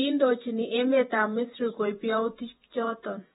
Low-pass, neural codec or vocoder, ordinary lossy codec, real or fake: 19.8 kHz; vocoder, 48 kHz, 128 mel bands, Vocos; AAC, 16 kbps; fake